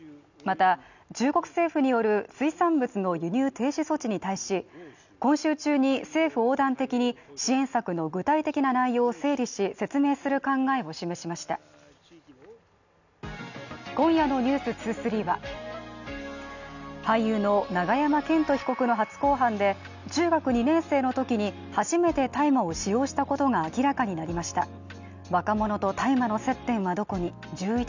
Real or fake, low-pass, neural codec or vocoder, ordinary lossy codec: real; 7.2 kHz; none; none